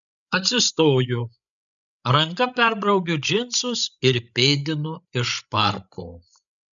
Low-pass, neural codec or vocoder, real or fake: 7.2 kHz; codec, 16 kHz, 16 kbps, FreqCodec, larger model; fake